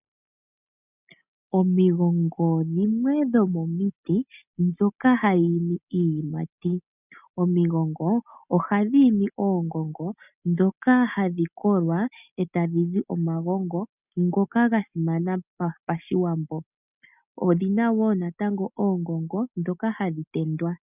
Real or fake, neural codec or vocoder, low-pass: real; none; 3.6 kHz